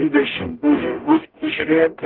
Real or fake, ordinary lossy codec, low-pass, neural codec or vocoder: fake; Opus, 16 kbps; 5.4 kHz; codec, 44.1 kHz, 0.9 kbps, DAC